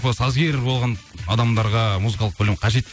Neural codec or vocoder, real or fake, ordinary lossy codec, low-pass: none; real; none; none